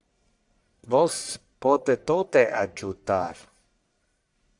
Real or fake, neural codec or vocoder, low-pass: fake; codec, 44.1 kHz, 1.7 kbps, Pupu-Codec; 10.8 kHz